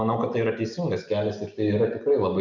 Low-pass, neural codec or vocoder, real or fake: 7.2 kHz; none; real